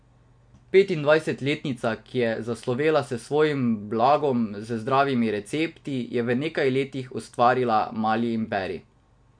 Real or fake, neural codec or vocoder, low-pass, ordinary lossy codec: real; none; 9.9 kHz; MP3, 64 kbps